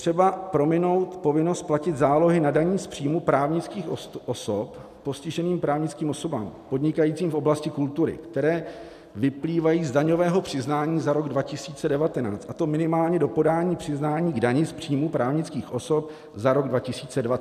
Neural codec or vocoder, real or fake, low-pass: none; real; 14.4 kHz